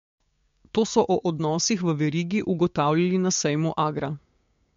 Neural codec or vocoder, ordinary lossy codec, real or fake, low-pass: codec, 16 kHz, 6 kbps, DAC; MP3, 48 kbps; fake; 7.2 kHz